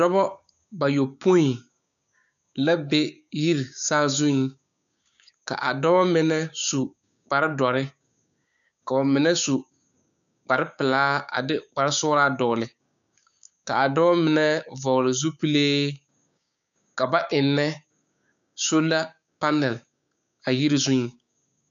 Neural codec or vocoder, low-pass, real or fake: codec, 16 kHz, 6 kbps, DAC; 7.2 kHz; fake